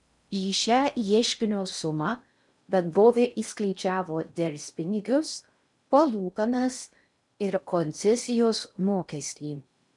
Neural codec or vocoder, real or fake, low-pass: codec, 16 kHz in and 24 kHz out, 0.6 kbps, FocalCodec, streaming, 4096 codes; fake; 10.8 kHz